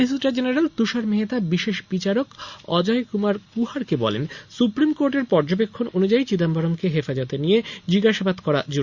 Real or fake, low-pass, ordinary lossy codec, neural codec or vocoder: real; 7.2 kHz; Opus, 64 kbps; none